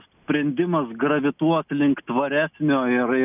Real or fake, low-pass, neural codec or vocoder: real; 3.6 kHz; none